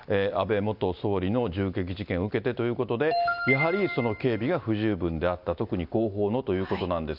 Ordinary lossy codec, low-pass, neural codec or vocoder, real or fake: none; 5.4 kHz; none; real